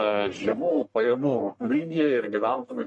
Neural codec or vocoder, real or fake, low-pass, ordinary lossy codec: codec, 44.1 kHz, 1.7 kbps, Pupu-Codec; fake; 10.8 kHz; MP3, 48 kbps